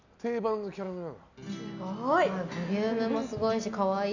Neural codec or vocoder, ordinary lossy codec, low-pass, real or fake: none; none; 7.2 kHz; real